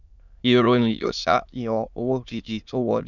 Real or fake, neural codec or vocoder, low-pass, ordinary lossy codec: fake; autoencoder, 22.05 kHz, a latent of 192 numbers a frame, VITS, trained on many speakers; 7.2 kHz; none